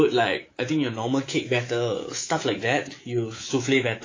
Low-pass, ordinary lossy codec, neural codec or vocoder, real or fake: 7.2 kHz; AAC, 32 kbps; codec, 24 kHz, 3.1 kbps, DualCodec; fake